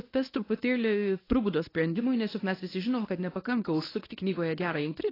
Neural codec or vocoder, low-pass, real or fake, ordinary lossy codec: codec, 24 kHz, 0.9 kbps, WavTokenizer, medium speech release version 1; 5.4 kHz; fake; AAC, 24 kbps